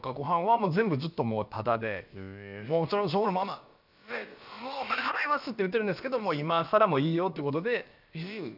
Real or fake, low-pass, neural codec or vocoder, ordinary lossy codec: fake; 5.4 kHz; codec, 16 kHz, about 1 kbps, DyCAST, with the encoder's durations; none